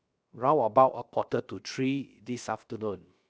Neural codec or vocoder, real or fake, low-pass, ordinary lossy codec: codec, 16 kHz, 0.7 kbps, FocalCodec; fake; none; none